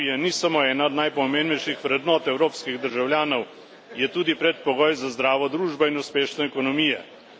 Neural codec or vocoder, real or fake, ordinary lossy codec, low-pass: none; real; none; none